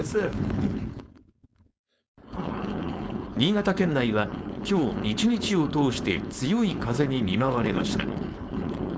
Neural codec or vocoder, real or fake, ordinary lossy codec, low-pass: codec, 16 kHz, 4.8 kbps, FACodec; fake; none; none